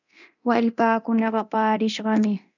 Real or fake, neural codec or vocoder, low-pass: fake; codec, 24 kHz, 0.9 kbps, DualCodec; 7.2 kHz